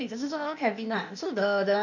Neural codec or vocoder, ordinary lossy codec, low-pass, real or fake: codec, 16 kHz, 0.8 kbps, ZipCodec; none; 7.2 kHz; fake